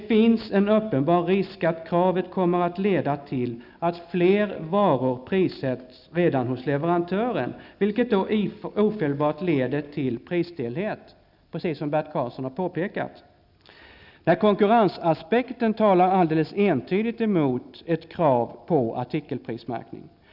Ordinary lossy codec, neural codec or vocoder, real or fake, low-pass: none; none; real; 5.4 kHz